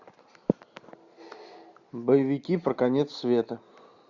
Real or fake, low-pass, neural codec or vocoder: real; 7.2 kHz; none